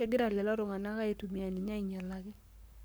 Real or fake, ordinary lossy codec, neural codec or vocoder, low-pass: fake; none; codec, 44.1 kHz, 7.8 kbps, Pupu-Codec; none